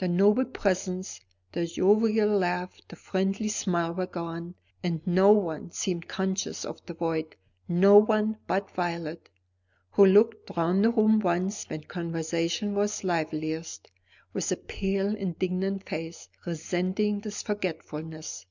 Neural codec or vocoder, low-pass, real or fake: none; 7.2 kHz; real